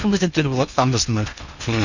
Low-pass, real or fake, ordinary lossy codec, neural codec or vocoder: 7.2 kHz; fake; none; codec, 16 kHz in and 24 kHz out, 0.8 kbps, FocalCodec, streaming, 65536 codes